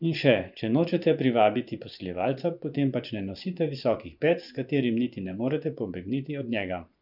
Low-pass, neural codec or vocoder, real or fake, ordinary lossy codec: 5.4 kHz; vocoder, 24 kHz, 100 mel bands, Vocos; fake; none